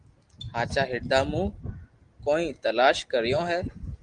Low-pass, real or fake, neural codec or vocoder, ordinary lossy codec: 9.9 kHz; real; none; Opus, 24 kbps